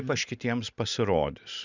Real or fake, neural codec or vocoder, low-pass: real; none; 7.2 kHz